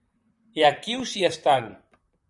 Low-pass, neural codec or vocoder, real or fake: 10.8 kHz; vocoder, 44.1 kHz, 128 mel bands, Pupu-Vocoder; fake